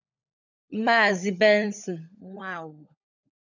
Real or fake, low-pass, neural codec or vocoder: fake; 7.2 kHz; codec, 16 kHz, 16 kbps, FunCodec, trained on LibriTTS, 50 frames a second